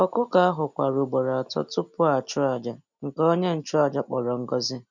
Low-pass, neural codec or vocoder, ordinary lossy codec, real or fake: 7.2 kHz; none; none; real